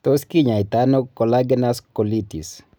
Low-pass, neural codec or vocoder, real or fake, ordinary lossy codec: none; none; real; none